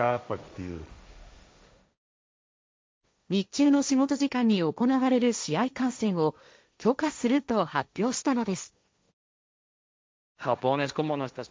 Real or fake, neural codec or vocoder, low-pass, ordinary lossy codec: fake; codec, 16 kHz, 1.1 kbps, Voila-Tokenizer; none; none